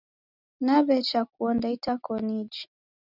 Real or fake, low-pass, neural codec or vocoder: real; 5.4 kHz; none